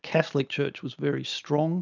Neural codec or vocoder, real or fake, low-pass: none; real; 7.2 kHz